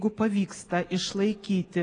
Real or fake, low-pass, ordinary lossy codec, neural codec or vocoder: real; 9.9 kHz; AAC, 32 kbps; none